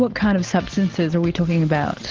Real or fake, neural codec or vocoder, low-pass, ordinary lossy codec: real; none; 7.2 kHz; Opus, 16 kbps